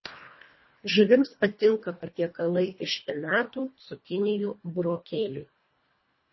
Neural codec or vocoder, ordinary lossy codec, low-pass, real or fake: codec, 24 kHz, 1.5 kbps, HILCodec; MP3, 24 kbps; 7.2 kHz; fake